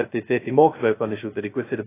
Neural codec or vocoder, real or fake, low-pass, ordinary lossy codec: codec, 16 kHz, 0.2 kbps, FocalCodec; fake; 3.6 kHz; AAC, 24 kbps